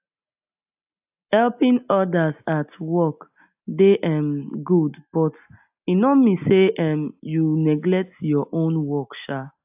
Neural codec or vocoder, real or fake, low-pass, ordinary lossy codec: none; real; 3.6 kHz; AAC, 32 kbps